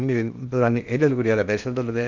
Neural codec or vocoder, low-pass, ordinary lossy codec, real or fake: codec, 16 kHz in and 24 kHz out, 0.8 kbps, FocalCodec, streaming, 65536 codes; 7.2 kHz; none; fake